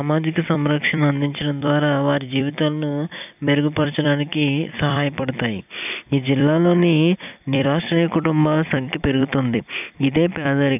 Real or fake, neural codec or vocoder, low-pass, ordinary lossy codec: fake; vocoder, 44.1 kHz, 128 mel bands every 256 samples, BigVGAN v2; 3.6 kHz; none